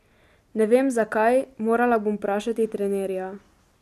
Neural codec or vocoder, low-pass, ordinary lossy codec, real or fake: none; 14.4 kHz; none; real